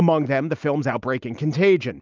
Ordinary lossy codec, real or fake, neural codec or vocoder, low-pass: Opus, 24 kbps; real; none; 7.2 kHz